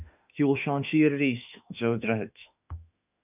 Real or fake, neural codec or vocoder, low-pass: fake; autoencoder, 48 kHz, 32 numbers a frame, DAC-VAE, trained on Japanese speech; 3.6 kHz